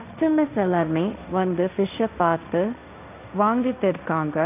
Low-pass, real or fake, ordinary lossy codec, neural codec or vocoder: 3.6 kHz; fake; none; codec, 16 kHz, 1.1 kbps, Voila-Tokenizer